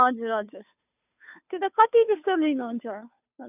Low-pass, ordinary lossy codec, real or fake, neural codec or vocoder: 3.6 kHz; none; fake; codec, 16 kHz, 2 kbps, FunCodec, trained on Chinese and English, 25 frames a second